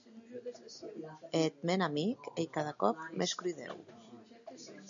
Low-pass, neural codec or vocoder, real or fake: 7.2 kHz; none; real